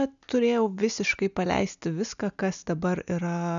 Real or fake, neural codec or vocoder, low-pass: real; none; 7.2 kHz